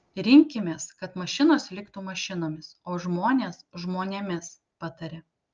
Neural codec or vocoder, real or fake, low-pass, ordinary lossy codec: none; real; 7.2 kHz; Opus, 24 kbps